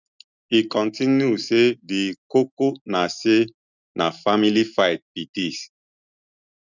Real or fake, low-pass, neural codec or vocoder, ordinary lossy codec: real; 7.2 kHz; none; none